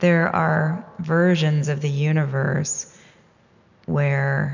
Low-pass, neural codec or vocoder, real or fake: 7.2 kHz; none; real